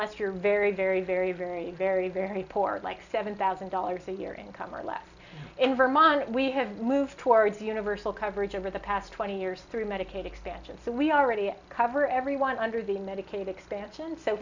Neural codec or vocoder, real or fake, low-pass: none; real; 7.2 kHz